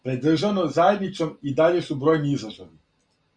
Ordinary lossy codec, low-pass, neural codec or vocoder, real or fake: Opus, 64 kbps; 9.9 kHz; none; real